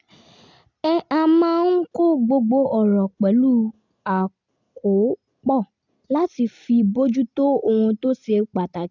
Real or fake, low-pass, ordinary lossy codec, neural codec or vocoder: real; 7.2 kHz; none; none